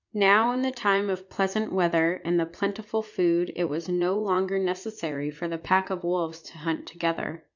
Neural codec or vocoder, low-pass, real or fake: vocoder, 44.1 kHz, 80 mel bands, Vocos; 7.2 kHz; fake